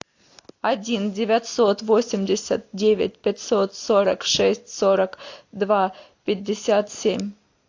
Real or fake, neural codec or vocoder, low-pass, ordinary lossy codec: real; none; 7.2 kHz; AAC, 48 kbps